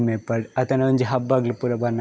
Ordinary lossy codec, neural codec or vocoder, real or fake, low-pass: none; none; real; none